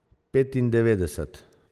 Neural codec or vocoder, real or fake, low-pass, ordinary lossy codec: none; real; 14.4 kHz; Opus, 24 kbps